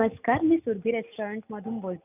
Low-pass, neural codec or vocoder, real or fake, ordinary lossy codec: 3.6 kHz; none; real; none